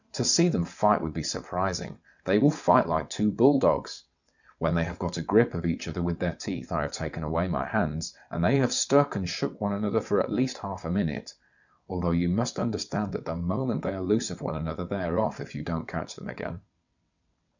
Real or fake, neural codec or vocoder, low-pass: fake; vocoder, 22.05 kHz, 80 mel bands, WaveNeXt; 7.2 kHz